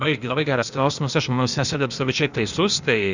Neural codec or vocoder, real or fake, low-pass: codec, 16 kHz, 0.8 kbps, ZipCodec; fake; 7.2 kHz